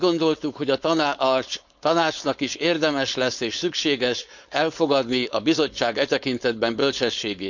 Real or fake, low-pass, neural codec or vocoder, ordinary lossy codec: fake; 7.2 kHz; codec, 16 kHz, 4.8 kbps, FACodec; none